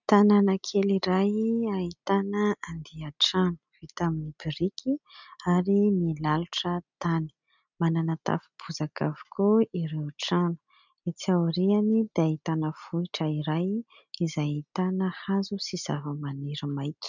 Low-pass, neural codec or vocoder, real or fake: 7.2 kHz; none; real